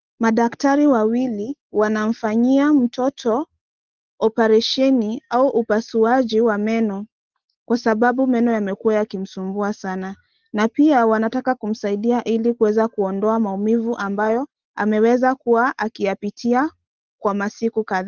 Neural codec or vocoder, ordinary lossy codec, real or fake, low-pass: none; Opus, 16 kbps; real; 7.2 kHz